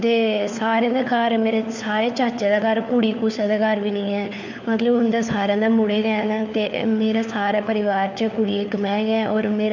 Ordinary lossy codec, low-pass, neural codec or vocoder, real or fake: none; 7.2 kHz; codec, 16 kHz, 4 kbps, FunCodec, trained on LibriTTS, 50 frames a second; fake